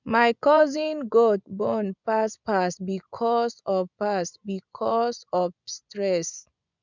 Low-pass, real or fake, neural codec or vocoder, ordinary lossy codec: 7.2 kHz; fake; vocoder, 24 kHz, 100 mel bands, Vocos; none